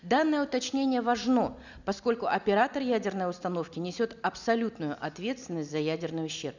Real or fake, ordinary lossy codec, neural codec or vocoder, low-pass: real; none; none; 7.2 kHz